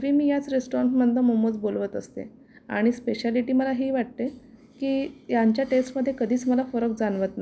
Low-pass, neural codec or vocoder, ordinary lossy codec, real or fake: none; none; none; real